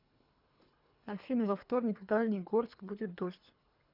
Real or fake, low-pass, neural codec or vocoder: fake; 5.4 kHz; codec, 24 kHz, 3 kbps, HILCodec